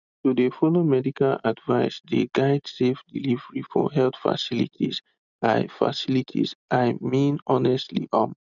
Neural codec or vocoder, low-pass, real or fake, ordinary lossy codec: none; 7.2 kHz; real; none